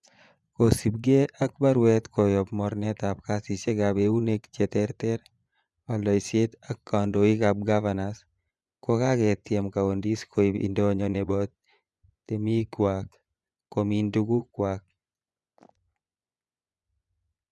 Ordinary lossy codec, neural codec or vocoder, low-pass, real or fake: none; vocoder, 24 kHz, 100 mel bands, Vocos; none; fake